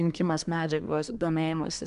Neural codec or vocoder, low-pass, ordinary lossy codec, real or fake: codec, 24 kHz, 1 kbps, SNAC; 10.8 kHz; Opus, 64 kbps; fake